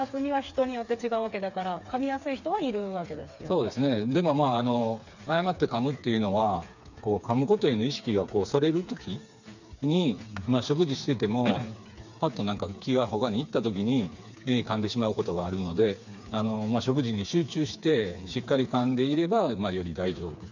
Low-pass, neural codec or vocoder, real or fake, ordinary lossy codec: 7.2 kHz; codec, 16 kHz, 4 kbps, FreqCodec, smaller model; fake; none